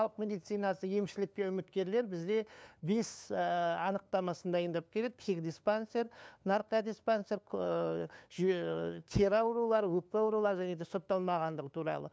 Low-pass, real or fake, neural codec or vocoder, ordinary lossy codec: none; fake; codec, 16 kHz, 2 kbps, FunCodec, trained on LibriTTS, 25 frames a second; none